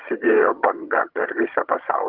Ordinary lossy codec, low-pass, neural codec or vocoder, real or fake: Opus, 32 kbps; 5.4 kHz; vocoder, 22.05 kHz, 80 mel bands, HiFi-GAN; fake